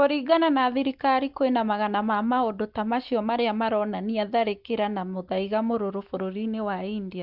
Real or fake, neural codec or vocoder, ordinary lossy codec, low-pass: real; none; Opus, 32 kbps; 5.4 kHz